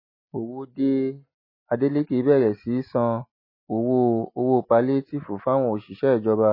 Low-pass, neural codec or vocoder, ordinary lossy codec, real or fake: 5.4 kHz; none; MP3, 24 kbps; real